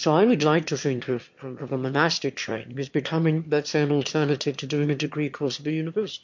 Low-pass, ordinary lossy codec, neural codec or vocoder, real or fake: 7.2 kHz; MP3, 48 kbps; autoencoder, 22.05 kHz, a latent of 192 numbers a frame, VITS, trained on one speaker; fake